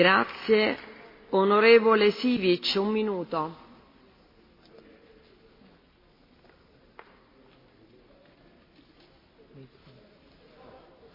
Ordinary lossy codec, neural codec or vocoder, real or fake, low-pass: MP3, 24 kbps; none; real; 5.4 kHz